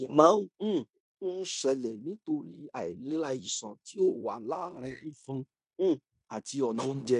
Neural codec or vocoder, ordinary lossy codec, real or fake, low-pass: codec, 16 kHz in and 24 kHz out, 0.9 kbps, LongCat-Audio-Codec, fine tuned four codebook decoder; none; fake; 10.8 kHz